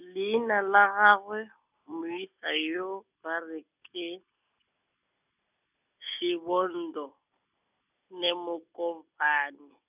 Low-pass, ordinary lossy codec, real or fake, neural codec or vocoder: 3.6 kHz; none; real; none